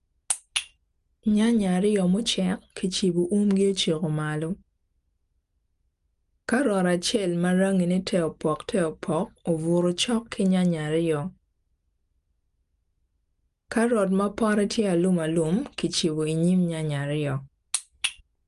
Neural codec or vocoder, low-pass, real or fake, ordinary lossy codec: none; 10.8 kHz; real; Opus, 32 kbps